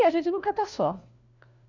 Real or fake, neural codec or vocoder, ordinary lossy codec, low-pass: fake; codec, 16 kHz, 1 kbps, FunCodec, trained on LibriTTS, 50 frames a second; none; 7.2 kHz